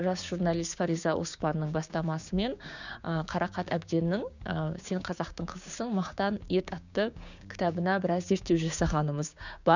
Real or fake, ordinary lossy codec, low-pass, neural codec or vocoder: fake; none; 7.2 kHz; codec, 16 kHz, 6 kbps, DAC